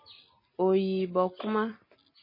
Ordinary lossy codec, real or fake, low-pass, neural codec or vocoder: MP3, 24 kbps; real; 5.4 kHz; none